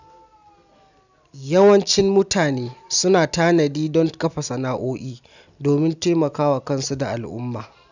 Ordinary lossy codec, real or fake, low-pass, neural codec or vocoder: none; real; 7.2 kHz; none